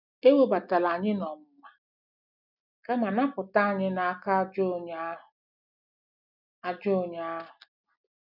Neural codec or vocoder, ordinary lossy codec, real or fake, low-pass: none; none; real; 5.4 kHz